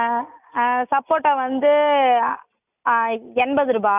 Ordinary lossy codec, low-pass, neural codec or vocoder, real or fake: none; 3.6 kHz; none; real